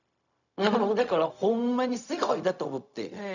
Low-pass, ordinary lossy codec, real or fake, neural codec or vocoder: 7.2 kHz; none; fake; codec, 16 kHz, 0.4 kbps, LongCat-Audio-Codec